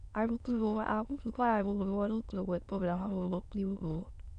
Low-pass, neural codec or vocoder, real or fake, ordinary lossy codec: 9.9 kHz; autoencoder, 22.05 kHz, a latent of 192 numbers a frame, VITS, trained on many speakers; fake; none